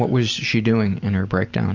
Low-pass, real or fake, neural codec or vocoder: 7.2 kHz; real; none